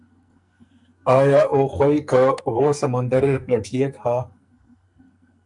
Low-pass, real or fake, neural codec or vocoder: 10.8 kHz; fake; codec, 44.1 kHz, 2.6 kbps, SNAC